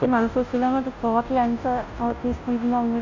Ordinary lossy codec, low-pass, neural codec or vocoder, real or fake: none; 7.2 kHz; codec, 16 kHz, 0.5 kbps, FunCodec, trained on Chinese and English, 25 frames a second; fake